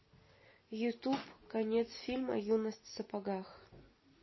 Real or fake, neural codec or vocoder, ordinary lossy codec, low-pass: real; none; MP3, 24 kbps; 7.2 kHz